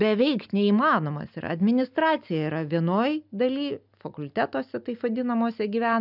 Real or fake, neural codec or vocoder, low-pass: real; none; 5.4 kHz